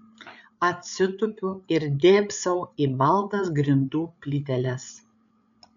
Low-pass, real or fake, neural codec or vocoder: 7.2 kHz; fake; codec, 16 kHz, 8 kbps, FreqCodec, larger model